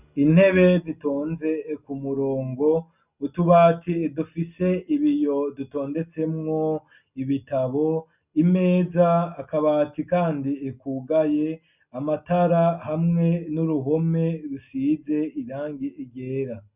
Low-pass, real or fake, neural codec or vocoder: 3.6 kHz; real; none